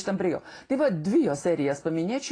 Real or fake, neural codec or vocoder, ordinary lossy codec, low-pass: real; none; AAC, 32 kbps; 9.9 kHz